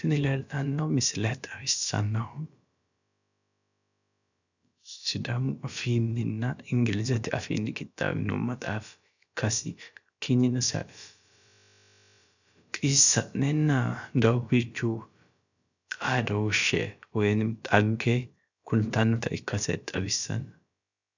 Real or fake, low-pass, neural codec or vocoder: fake; 7.2 kHz; codec, 16 kHz, about 1 kbps, DyCAST, with the encoder's durations